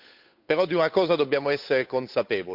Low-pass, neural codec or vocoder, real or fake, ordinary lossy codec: 5.4 kHz; codec, 16 kHz, 8 kbps, FunCodec, trained on Chinese and English, 25 frames a second; fake; none